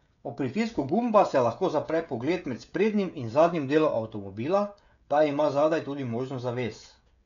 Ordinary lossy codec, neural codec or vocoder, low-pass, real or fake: none; codec, 16 kHz, 16 kbps, FreqCodec, smaller model; 7.2 kHz; fake